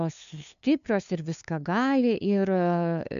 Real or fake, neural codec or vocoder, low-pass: fake; codec, 16 kHz, 2 kbps, FunCodec, trained on LibriTTS, 25 frames a second; 7.2 kHz